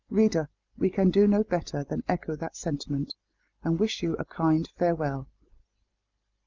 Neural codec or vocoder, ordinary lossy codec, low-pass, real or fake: none; Opus, 24 kbps; 7.2 kHz; real